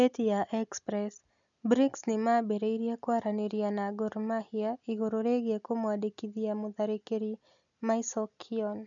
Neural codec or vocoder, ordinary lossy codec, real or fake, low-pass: none; none; real; 7.2 kHz